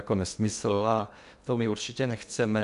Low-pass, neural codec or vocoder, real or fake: 10.8 kHz; codec, 16 kHz in and 24 kHz out, 0.8 kbps, FocalCodec, streaming, 65536 codes; fake